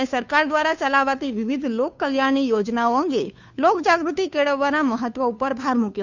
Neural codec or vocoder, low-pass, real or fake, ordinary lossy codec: codec, 16 kHz, 2 kbps, FunCodec, trained on Chinese and English, 25 frames a second; 7.2 kHz; fake; none